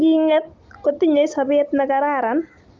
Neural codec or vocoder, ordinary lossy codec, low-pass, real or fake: none; Opus, 32 kbps; 7.2 kHz; real